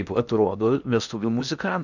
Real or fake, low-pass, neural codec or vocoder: fake; 7.2 kHz; codec, 16 kHz in and 24 kHz out, 0.8 kbps, FocalCodec, streaming, 65536 codes